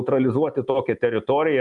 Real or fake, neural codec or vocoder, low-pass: real; none; 10.8 kHz